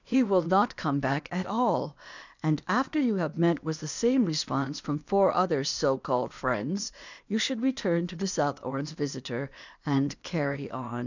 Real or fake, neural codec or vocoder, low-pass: fake; codec, 16 kHz, 0.8 kbps, ZipCodec; 7.2 kHz